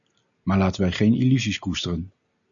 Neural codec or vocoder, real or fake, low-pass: none; real; 7.2 kHz